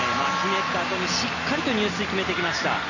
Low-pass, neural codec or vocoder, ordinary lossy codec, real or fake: 7.2 kHz; none; none; real